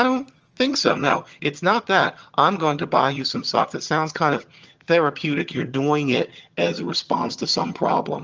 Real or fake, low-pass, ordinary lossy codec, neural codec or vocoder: fake; 7.2 kHz; Opus, 24 kbps; vocoder, 22.05 kHz, 80 mel bands, HiFi-GAN